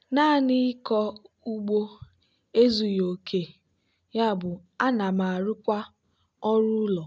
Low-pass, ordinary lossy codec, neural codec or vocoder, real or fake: none; none; none; real